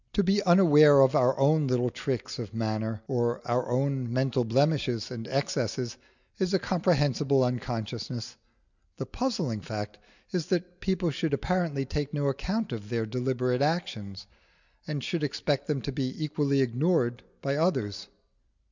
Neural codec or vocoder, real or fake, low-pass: none; real; 7.2 kHz